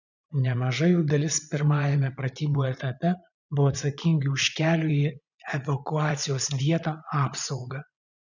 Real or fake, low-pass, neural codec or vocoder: fake; 7.2 kHz; vocoder, 44.1 kHz, 80 mel bands, Vocos